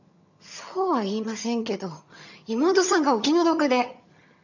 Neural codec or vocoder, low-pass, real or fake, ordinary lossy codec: vocoder, 22.05 kHz, 80 mel bands, HiFi-GAN; 7.2 kHz; fake; none